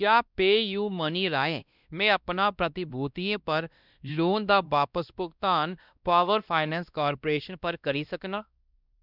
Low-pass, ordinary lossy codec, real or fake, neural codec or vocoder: 5.4 kHz; none; fake; codec, 16 kHz, 1 kbps, X-Codec, WavLM features, trained on Multilingual LibriSpeech